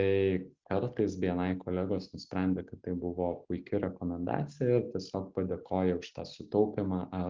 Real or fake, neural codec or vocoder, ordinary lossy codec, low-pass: real; none; Opus, 32 kbps; 7.2 kHz